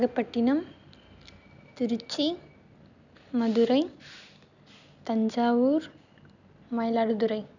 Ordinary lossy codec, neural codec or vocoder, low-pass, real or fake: none; none; 7.2 kHz; real